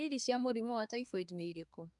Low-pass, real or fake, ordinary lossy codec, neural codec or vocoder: 10.8 kHz; fake; MP3, 96 kbps; codec, 24 kHz, 1 kbps, SNAC